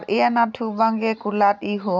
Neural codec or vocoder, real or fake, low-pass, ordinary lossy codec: none; real; none; none